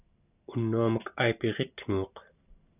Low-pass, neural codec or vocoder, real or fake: 3.6 kHz; none; real